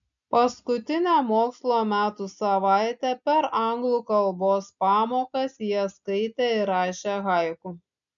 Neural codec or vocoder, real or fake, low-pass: none; real; 7.2 kHz